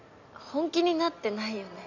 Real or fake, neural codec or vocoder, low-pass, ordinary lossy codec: real; none; 7.2 kHz; none